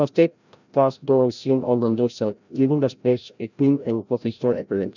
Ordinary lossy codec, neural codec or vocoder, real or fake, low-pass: none; codec, 16 kHz, 0.5 kbps, FreqCodec, larger model; fake; 7.2 kHz